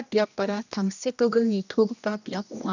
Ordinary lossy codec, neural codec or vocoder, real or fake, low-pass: none; codec, 16 kHz, 1 kbps, X-Codec, HuBERT features, trained on general audio; fake; 7.2 kHz